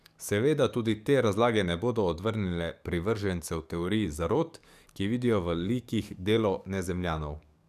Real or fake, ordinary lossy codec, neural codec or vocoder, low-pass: fake; none; codec, 44.1 kHz, 7.8 kbps, DAC; 14.4 kHz